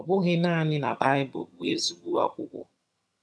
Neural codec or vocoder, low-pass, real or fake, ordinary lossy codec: vocoder, 22.05 kHz, 80 mel bands, HiFi-GAN; none; fake; none